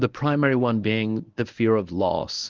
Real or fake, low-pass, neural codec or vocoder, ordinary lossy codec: fake; 7.2 kHz; codec, 24 kHz, 0.9 kbps, WavTokenizer, medium speech release version 1; Opus, 32 kbps